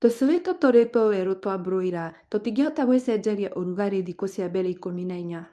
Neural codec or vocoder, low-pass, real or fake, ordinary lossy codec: codec, 24 kHz, 0.9 kbps, WavTokenizer, medium speech release version 1; none; fake; none